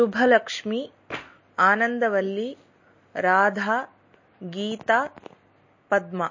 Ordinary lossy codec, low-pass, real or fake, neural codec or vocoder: MP3, 32 kbps; 7.2 kHz; real; none